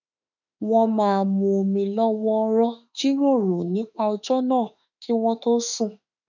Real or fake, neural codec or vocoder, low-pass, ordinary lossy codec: fake; autoencoder, 48 kHz, 32 numbers a frame, DAC-VAE, trained on Japanese speech; 7.2 kHz; none